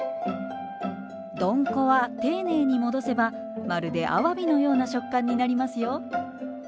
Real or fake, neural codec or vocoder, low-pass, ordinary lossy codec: real; none; none; none